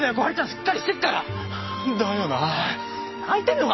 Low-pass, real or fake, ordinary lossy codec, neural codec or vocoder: 7.2 kHz; real; MP3, 24 kbps; none